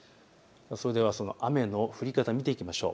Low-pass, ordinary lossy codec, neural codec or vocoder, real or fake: none; none; none; real